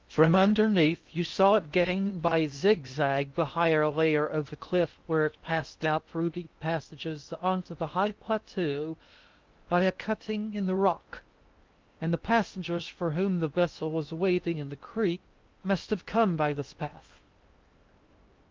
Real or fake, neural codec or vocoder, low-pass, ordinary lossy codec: fake; codec, 16 kHz in and 24 kHz out, 0.8 kbps, FocalCodec, streaming, 65536 codes; 7.2 kHz; Opus, 32 kbps